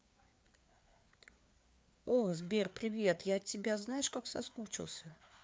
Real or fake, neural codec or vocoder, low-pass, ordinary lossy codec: fake; codec, 16 kHz, 4 kbps, FreqCodec, larger model; none; none